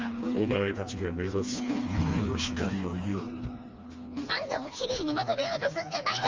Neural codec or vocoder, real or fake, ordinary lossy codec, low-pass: codec, 16 kHz, 2 kbps, FreqCodec, smaller model; fake; Opus, 32 kbps; 7.2 kHz